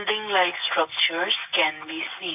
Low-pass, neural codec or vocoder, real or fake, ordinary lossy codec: 3.6 kHz; none; real; AAC, 24 kbps